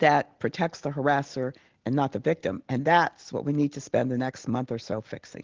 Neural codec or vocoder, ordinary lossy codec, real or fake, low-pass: none; Opus, 16 kbps; real; 7.2 kHz